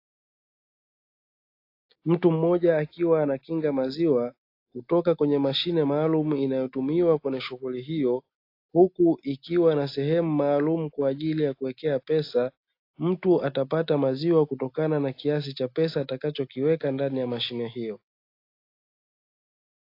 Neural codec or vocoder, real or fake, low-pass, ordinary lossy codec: none; real; 5.4 kHz; AAC, 32 kbps